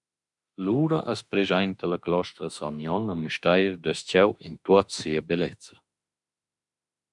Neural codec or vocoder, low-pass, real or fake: autoencoder, 48 kHz, 32 numbers a frame, DAC-VAE, trained on Japanese speech; 10.8 kHz; fake